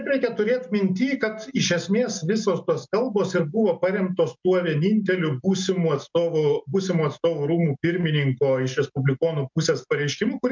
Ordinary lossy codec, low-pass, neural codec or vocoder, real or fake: MP3, 64 kbps; 7.2 kHz; none; real